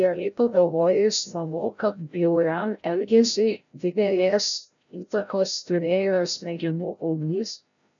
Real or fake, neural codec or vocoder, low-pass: fake; codec, 16 kHz, 0.5 kbps, FreqCodec, larger model; 7.2 kHz